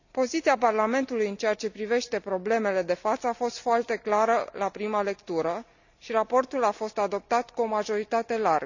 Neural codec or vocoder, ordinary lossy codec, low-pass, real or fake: none; none; 7.2 kHz; real